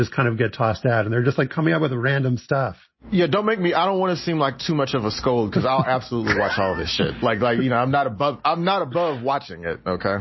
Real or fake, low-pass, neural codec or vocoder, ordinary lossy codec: real; 7.2 kHz; none; MP3, 24 kbps